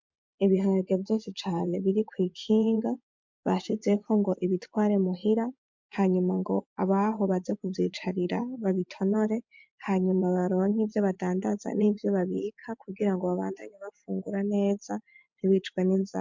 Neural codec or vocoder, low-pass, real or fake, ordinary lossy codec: vocoder, 24 kHz, 100 mel bands, Vocos; 7.2 kHz; fake; MP3, 64 kbps